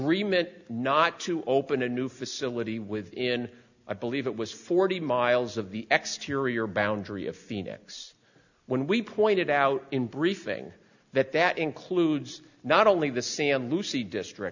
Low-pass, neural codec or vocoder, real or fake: 7.2 kHz; none; real